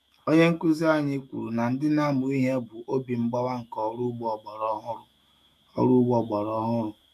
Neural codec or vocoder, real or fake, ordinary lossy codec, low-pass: autoencoder, 48 kHz, 128 numbers a frame, DAC-VAE, trained on Japanese speech; fake; none; 14.4 kHz